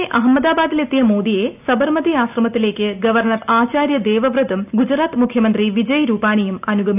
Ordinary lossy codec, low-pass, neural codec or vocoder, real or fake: none; 3.6 kHz; none; real